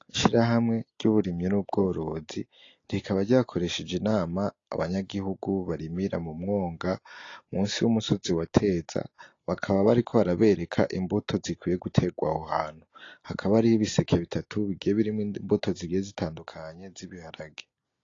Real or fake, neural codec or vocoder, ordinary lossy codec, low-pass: real; none; AAC, 32 kbps; 7.2 kHz